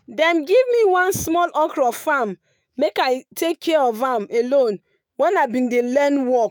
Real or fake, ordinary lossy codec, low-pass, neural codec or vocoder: fake; none; none; autoencoder, 48 kHz, 128 numbers a frame, DAC-VAE, trained on Japanese speech